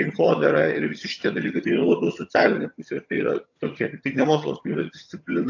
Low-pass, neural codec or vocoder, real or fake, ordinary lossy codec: 7.2 kHz; vocoder, 22.05 kHz, 80 mel bands, HiFi-GAN; fake; AAC, 48 kbps